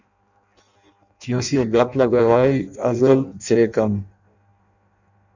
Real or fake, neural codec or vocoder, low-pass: fake; codec, 16 kHz in and 24 kHz out, 0.6 kbps, FireRedTTS-2 codec; 7.2 kHz